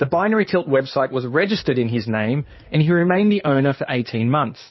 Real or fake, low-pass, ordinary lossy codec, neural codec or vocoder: fake; 7.2 kHz; MP3, 24 kbps; codec, 16 kHz in and 24 kHz out, 2.2 kbps, FireRedTTS-2 codec